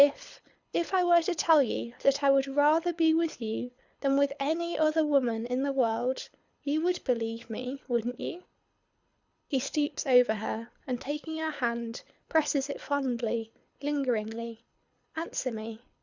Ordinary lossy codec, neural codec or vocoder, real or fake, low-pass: Opus, 64 kbps; codec, 24 kHz, 6 kbps, HILCodec; fake; 7.2 kHz